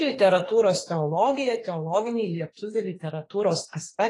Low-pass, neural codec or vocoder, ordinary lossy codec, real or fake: 10.8 kHz; autoencoder, 48 kHz, 32 numbers a frame, DAC-VAE, trained on Japanese speech; AAC, 32 kbps; fake